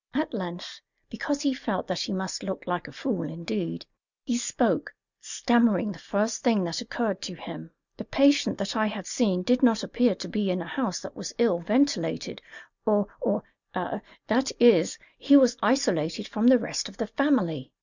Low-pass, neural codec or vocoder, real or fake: 7.2 kHz; none; real